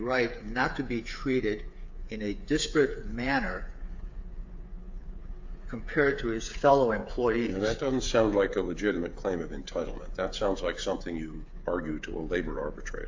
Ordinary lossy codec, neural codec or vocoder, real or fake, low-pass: AAC, 48 kbps; codec, 16 kHz, 8 kbps, FreqCodec, smaller model; fake; 7.2 kHz